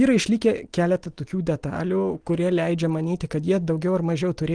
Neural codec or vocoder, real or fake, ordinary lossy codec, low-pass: none; real; Opus, 24 kbps; 9.9 kHz